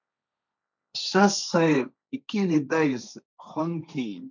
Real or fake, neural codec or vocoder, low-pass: fake; codec, 16 kHz, 1.1 kbps, Voila-Tokenizer; 7.2 kHz